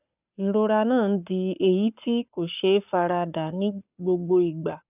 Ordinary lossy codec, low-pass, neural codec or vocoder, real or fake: none; 3.6 kHz; codec, 44.1 kHz, 7.8 kbps, Pupu-Codec; fake